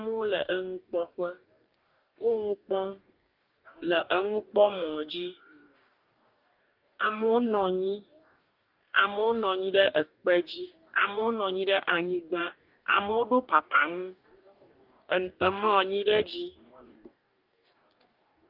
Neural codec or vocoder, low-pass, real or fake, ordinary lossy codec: codec, 44.1 kHz, 2.6 kbps, DAC; 5.4 kHz; fake; Opus, 32 kbps